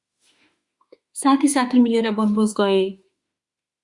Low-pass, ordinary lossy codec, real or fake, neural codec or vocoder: 10.8 kHz; Opus, 64 kbps; fake; autoencoder, 48 kHz, 32 numbers a frame, DAC-VAE, trained on Japanese speech